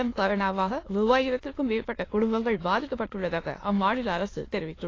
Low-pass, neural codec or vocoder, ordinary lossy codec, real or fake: 7.2 kHz; autoencoder, 22.05 kHz, a latent of 192 numbers a frame, VITS, trained on many speakers; AAC, 32 kbps; fake